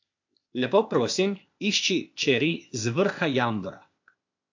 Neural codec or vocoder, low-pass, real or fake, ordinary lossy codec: codec, 16 kHz, 0.8 kbps, ZipCodec; 7.2 kHz; fake; AAC, 48 kbps